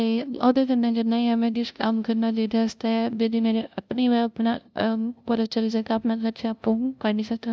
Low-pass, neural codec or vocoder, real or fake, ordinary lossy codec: none; codec, 16 kHz, 0.5 kbps, FunCodec, trained on LibriTTS, 25 frames a second; fake; none